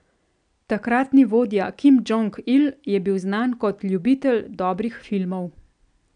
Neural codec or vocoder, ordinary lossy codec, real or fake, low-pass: none; none; real; 9.9 kHz